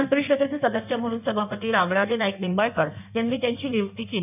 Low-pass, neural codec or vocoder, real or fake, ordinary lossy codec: 3.6 kHz; codec, 16 kHz in and 24 kHz out, 1.1 kbps, FireRedTTS-2 codec; fake; none